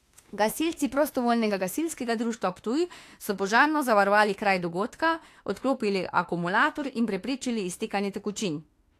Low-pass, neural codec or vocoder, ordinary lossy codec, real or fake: 14.4 kHz; autoencoder, 48 kHz, 32 numbers a frame, DAC-VAE, trained on Japanese speech; AAC, 64 kbps; fake